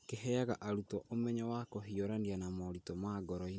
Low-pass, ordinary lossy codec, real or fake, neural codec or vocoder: none; none; real; none